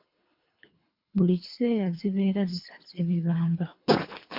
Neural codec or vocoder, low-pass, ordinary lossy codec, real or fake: codec, 24 kHz, 3 kbps, HILCodec; 5.4 kHz; MP3, 48 kbps; fake